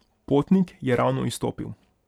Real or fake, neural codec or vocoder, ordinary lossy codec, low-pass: fake; vocoder, 44.1 kHz, 128 mel bands every 512 samples, BigVGAN v2; none; 19.8 kHz